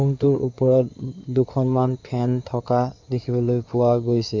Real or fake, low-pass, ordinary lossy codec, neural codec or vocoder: fake; 7.2 kHz; MP3, 64 kbps; codec, 16 kHz in and 24 kHz out, 2.2 kbps, FireRedTTS-2 codec